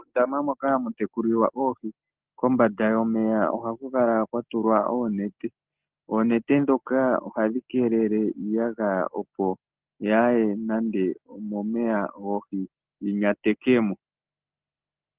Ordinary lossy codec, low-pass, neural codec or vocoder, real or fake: Opus, 16 kbps; 3.6 kHz; none; real